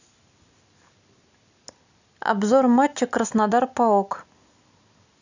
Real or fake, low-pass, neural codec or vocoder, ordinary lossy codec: real; 7.2 kHz; none; none